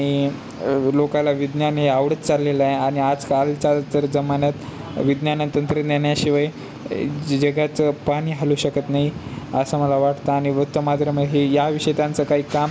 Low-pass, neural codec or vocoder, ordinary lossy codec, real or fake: none; none; none; real